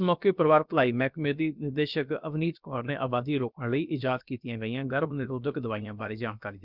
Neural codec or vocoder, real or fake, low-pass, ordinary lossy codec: codec, 16 kHz, about 1 kbps, DyCAST, with the encoder's durations; fake; 5.4 kHz; none